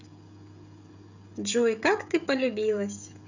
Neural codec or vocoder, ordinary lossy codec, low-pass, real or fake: codec, 16 kHz, 16 kbps, FreqCodec, smaller model; none; 7.2 kHz; fake